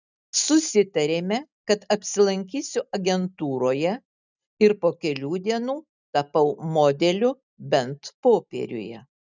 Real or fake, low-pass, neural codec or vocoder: real; 7.2 kHz; none